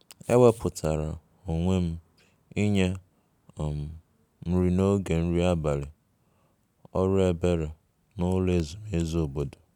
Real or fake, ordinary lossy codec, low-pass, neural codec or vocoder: real; none; 19.8 kHz; none